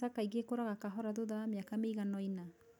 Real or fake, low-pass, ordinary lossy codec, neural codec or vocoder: real; none; none; none